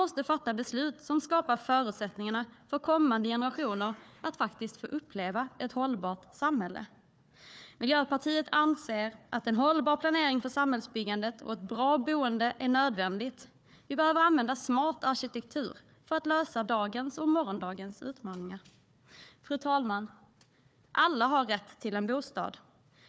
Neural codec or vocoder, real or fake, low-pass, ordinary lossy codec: codec, 16 kHz, 4 kbps, FunCodec, trained on Chinese and English, 50 frames a second; fake; none; none